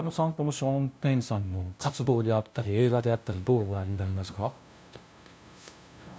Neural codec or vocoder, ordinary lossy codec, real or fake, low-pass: codec, 16 kHz, 0.5 kbps, FunCodec, trained on LibriTTS, 25 frames a second; none; fake; none